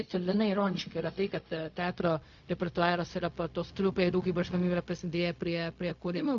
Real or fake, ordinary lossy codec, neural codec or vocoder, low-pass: fake; MP3, 48 kbps; codec, 16 kHz, 0.4 kbps, LongCat-Audio-Codec; 7.2 kHz